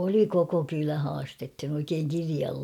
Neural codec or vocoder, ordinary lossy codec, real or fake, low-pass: none; none; real; 19.8 kHz